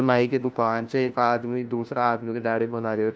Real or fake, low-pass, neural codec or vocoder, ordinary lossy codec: fake; none; codec, 16 kHz, 1 kbps, FunCodec, trained on LibriTTS, 50 frames a second; none